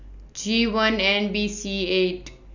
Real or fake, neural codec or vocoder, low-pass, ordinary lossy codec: real; none; 7.2 kHz; none